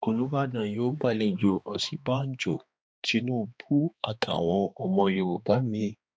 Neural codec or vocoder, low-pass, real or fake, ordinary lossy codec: codec, 16 kHz, 2 kbps, X-Codec, HuBERT features, trained on balanced general audio; none; fake; none